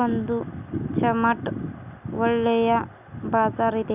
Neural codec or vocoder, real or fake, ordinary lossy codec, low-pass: none; real; none; 3.6 kHz